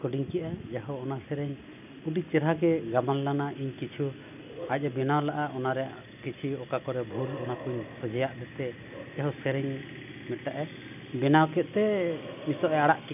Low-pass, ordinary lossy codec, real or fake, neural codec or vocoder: 3.6 kHz; none; real; none